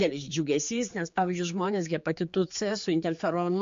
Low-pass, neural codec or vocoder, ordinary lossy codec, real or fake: 7.2 kHz; codec, 16 kHz, 4 kbps, X-Codec, HuBERT features, trained on general audio; MP3, 48 kbps; fake